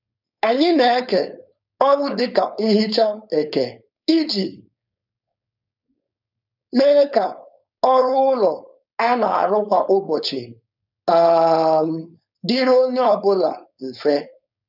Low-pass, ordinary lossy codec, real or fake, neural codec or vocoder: 5.4 kHz; none; fake; codec, 16 kHz, 4.8 kbps, FACodec